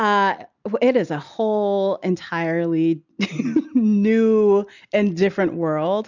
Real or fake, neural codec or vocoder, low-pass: real; none; 7.2 kHz